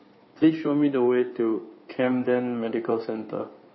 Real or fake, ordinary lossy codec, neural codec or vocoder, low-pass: fake; MP3, 24 kbps; codec, 16 kHz in and 24 kHz out, 2.2 kbps, FireRedTTS-2 codec; 7.2 kHz